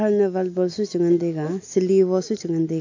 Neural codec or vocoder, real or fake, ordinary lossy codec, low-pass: none; real; AAC, 48 kbps; 7.2 kHz